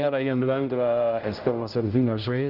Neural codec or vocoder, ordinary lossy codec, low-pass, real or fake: codec, 16 kHz, 0.5 kbps, X-Codec, HuBERT features, trained on general audio; Opus, 24 kbps; 5.4 kHz; fake